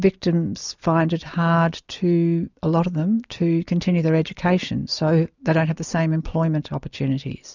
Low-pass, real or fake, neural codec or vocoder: 7.2 kHz; real; none